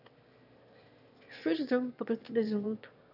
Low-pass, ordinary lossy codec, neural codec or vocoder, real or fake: 5.4 kHz; none; autoencoder, 22.05 kHz, a latent of 192 numbers a frame, VITS, trained on one speaker; fake